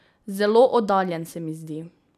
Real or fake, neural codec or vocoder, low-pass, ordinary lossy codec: real; none; 14.4 kHz; none